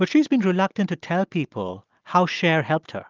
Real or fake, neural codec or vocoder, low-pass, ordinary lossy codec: real; none; 7.2 kHz; Opus, 32 kbps